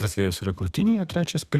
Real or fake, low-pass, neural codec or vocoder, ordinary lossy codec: fake; 14.4 kHz; codec, 32 kHz, 1.9 kbps, SNAC; Opus, 64 kbps